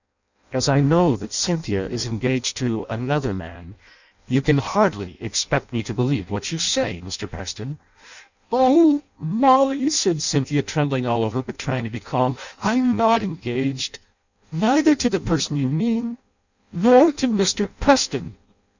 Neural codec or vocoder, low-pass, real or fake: codec, 16 kHz in and 24 kHz out, 0.6 kbps, FireRedTTS-2 codec; 7.2 kHz; fake